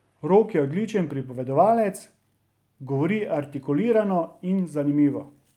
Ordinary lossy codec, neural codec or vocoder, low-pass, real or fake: Opus, 32 kbps; none; 19.8 kHz; real